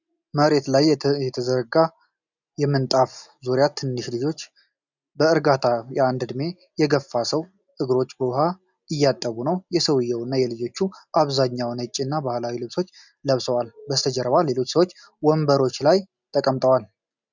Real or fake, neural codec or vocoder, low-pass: real; none; 7.2 kHz